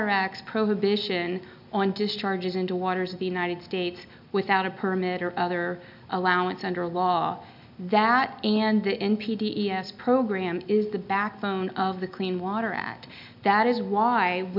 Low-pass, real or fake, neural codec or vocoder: 5.4 kHz; real; none